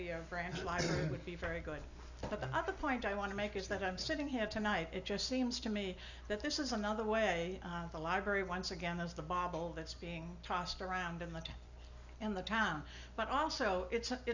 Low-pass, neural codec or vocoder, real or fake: 7.2 kHz; none; real